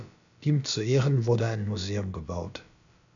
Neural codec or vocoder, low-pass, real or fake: codec, 16 kHz, about 1 kbps, DyCAST, with the encoder's durations; 7.2 kHz; fake